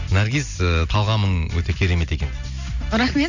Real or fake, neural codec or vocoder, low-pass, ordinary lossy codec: real; none; 7.2 kHz; none